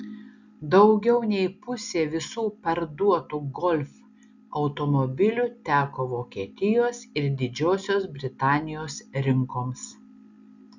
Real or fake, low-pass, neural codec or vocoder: real; 7.2 kHz; none